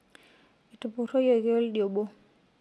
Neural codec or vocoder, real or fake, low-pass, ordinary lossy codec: none; real; none; none